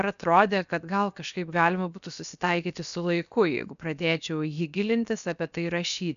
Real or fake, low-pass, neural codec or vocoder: fake; 7.2 kHz; codec, 16 kHz, 0.7 kbps, FocalCodec